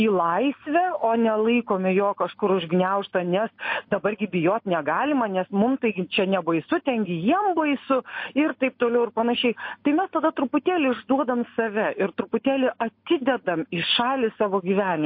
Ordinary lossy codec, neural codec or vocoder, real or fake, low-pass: MP3, 32 kbps; none; real; 5.4 kHz